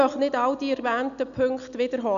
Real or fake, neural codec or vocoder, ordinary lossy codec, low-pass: real; none; none; 7.2 kHz